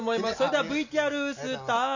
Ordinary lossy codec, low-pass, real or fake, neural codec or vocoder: none; 7.2 kHz; real; none